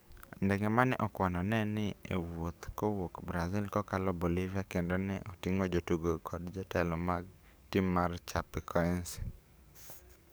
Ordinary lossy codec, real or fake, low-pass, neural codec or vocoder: none; fake; none; codec, 44.1 kHz, 7.8 kbps, DAC